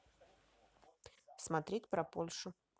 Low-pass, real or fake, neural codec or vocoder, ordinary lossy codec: none; real; none; none